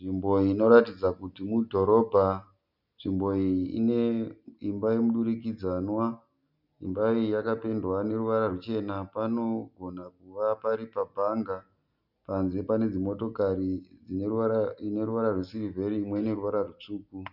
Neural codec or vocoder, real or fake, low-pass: none; real; 5.4 kHz